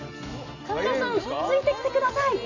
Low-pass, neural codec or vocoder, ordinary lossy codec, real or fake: 7.2 kHz; none; none; real